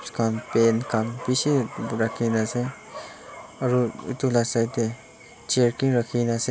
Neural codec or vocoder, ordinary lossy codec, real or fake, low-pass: none; none; real; none